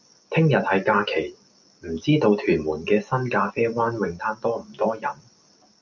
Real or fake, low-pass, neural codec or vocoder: real; 7.2 kHz; none